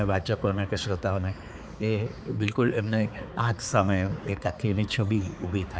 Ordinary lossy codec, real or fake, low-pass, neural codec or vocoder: none; fake; none; codec, 16 kHz, 4 kbps, X-Codec, HuBERT features, trained on balanced general audio